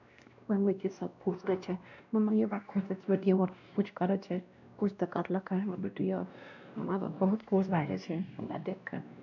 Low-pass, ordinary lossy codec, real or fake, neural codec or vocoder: 7.2 kHz; none; fake; codec, 16 kHz, 1 kbps, X-Codec, WavLM features, trained on Multilingual LibriSpeech